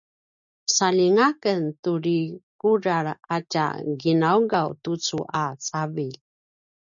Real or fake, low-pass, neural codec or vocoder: real; 7.2 kHz; none